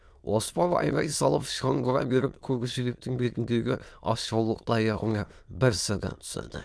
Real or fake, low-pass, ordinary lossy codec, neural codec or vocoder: fake; none; none; autoencoder, 22.05 kHz, a latent of 192 numbers a frame, VITS, trained on many speakers